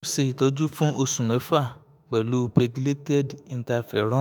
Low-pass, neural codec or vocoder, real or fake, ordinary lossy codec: none; autoencoder, 48 kHz, 32 numbers a frame, DAC-VAE, trained on Japanese speech; fake; none